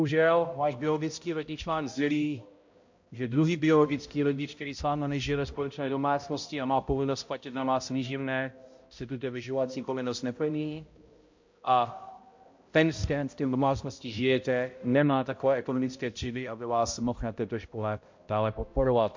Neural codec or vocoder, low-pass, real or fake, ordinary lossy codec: codec, 16 kHz, 0.5 kbps, X-Codec, HuBERT features, trained on balanced general audio; 7.2 kHz; fake; MP3, 48 kbps